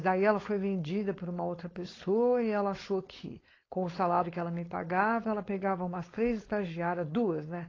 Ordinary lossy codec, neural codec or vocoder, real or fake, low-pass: AAC, 32 kbps; codec, 16 kHz, 4.8 kbps, FACodec; fake; 7.2 kHz